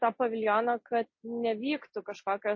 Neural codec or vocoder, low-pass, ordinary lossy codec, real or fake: none; 7.2 kHz; MP3, 32 kbps; real